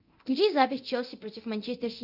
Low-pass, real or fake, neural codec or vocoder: 5.4 kHz; fake; codec, 24 kHz, 0.9 kbps, DualCodec